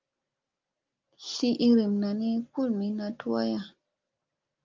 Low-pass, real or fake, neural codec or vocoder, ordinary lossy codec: 7.2 kHz; real; none; Opus, 32 kbps